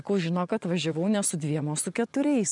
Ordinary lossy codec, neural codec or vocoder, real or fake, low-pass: MP3, 96 kbps; vocoder, 24 kHz, 100 mel bands, Vocos; fake; 10.8 kHz